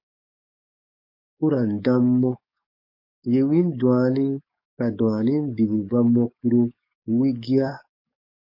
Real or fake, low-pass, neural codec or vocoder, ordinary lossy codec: fake; 5.4 kHz; codec, 44.1 kHz, 7.8 kbps, DAC; MP3, 32 kbps